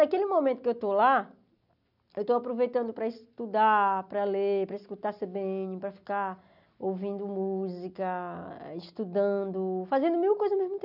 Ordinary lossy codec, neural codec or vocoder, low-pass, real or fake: none; none; 5.4 kHz; real